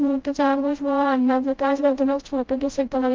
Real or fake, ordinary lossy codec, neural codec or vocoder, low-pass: fake; Opus, 24 kbps; codec, 16 kHz, 0.5 kbps, FreqCodec, smaller model; 7.2 kHz